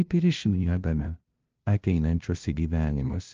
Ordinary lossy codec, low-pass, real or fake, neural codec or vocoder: Opus, 24 kbps; 7.2 kHz; fake; codec, 16 kHz, 0.5 kbps, FunCodec, trained on LibriTTS, 25 frames a second